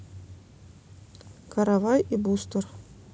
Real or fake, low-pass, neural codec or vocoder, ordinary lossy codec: real; none; none; none